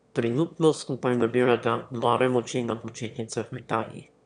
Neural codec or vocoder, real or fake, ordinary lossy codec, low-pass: autoencoder, 22.05 kHz, a latent of 192 numbers a frame, VITS, trained on one speaker; fake; none; 9.9 kHz